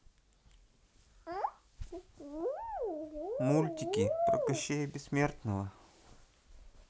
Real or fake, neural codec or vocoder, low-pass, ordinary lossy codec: real; none; none; none